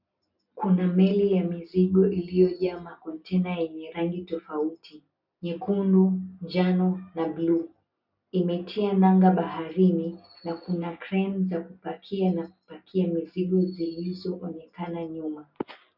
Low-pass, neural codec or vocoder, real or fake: 5.4 kHz; none; real